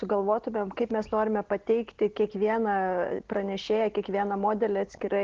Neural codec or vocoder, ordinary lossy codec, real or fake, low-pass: none; Opus, 16 kbps; real; 7.2 kHz